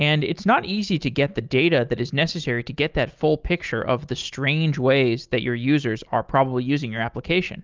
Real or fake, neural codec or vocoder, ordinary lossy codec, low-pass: real; none; Opus, 32 kbps; 7.2 kHz